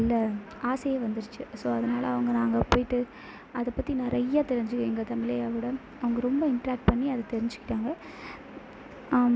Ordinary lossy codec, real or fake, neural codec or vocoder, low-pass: none; real; none; none